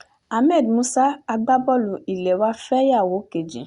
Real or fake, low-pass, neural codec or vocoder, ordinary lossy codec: real; 10.8 kHz; none; Opus, 64 kbps